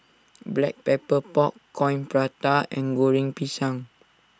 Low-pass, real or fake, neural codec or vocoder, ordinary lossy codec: none; real; none; none